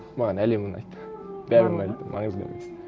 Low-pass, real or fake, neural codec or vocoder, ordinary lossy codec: none; real; none; none